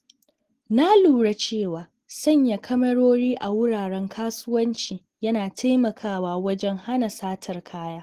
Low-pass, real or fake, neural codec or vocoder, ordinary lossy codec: 14.4 kHz; real; none; Opus, 16 kbps